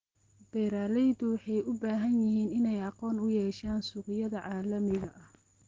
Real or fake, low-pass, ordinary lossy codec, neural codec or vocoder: real; 7.2 kHz; Opus, 16 kbps; none